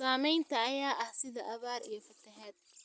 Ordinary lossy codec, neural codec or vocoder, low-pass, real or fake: none; none; none; real